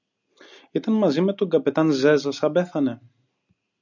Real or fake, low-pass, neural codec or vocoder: real; 7.2 kHz; none